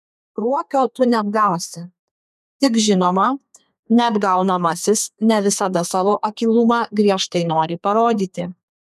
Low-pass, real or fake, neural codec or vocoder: 14.4 kHz; fake; codec, 32 kHz, 1.9 kbps, SNAC